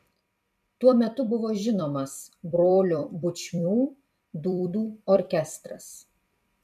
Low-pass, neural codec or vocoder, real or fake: 14.4 kHz; vocoder, 44.1 kHz, 128 mel bands every 256 samples, BigVGAN v2; fake